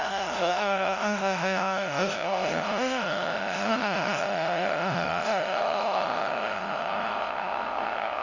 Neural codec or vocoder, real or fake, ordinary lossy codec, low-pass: codec, 16 kHz, 0.5 kbps, FunCodec, trained on LibriTTS, 25 frames a second; fake; none; 7.2 kHz